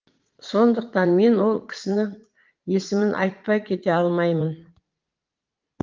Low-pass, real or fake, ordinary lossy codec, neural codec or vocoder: 7.2 kHz; fake; Opus, 32 kbps; vocoder, 44.1 kHz, 80 mel bands, Vocos